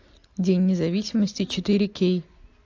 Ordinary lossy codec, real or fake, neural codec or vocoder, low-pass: AAC, 48 kbps; real; none; 7.2 kHz